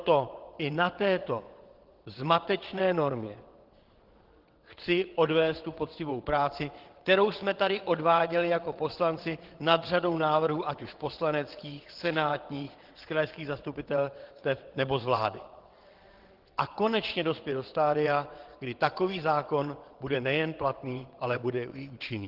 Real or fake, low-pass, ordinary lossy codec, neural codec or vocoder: fake; 5.4 kHz; Opus, 16 kbps; vocoder, 22.05 kHz, 80 mel bands, WaveNeXt